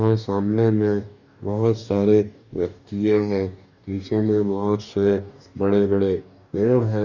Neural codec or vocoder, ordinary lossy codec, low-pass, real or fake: codec, 44.1 kHz, 2.6 kbps, DAC; none; 7.2 kHz; fake